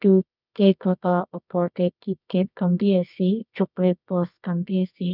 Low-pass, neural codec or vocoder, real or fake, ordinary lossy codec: 5.4 kHz; codec, 24 kHz, 0.9 kbps, WavTokenizer, medium music audio release; fake; none